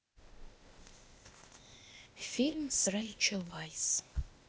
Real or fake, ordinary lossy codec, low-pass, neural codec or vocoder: fake; none; none; codec, 16 kHz, 0.8 kbps, ZipCodec